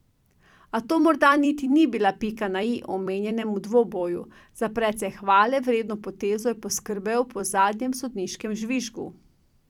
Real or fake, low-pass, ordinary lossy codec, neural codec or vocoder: fake; 19.8 kHz; none; vocoder, 44.1 kHz, 128 mel bands every 256 samples, BigVGAN v2